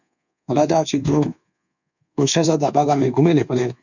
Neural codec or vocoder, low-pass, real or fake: codec, 24 kHz, 1.2 kbps, DualCodec; 7.2 kHz; fake